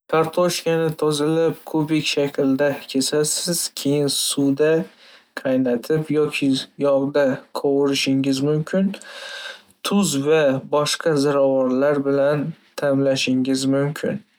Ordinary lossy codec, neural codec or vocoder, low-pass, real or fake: none; none; none; real